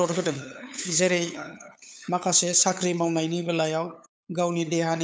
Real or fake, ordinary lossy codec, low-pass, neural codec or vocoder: fake; none; none; codec, 16 kHz, 8 kbps, FunCodec, trained on LibriTTS, 25 frames a second